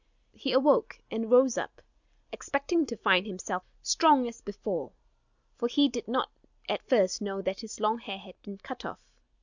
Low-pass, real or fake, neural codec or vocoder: 7.2 kHz; real; none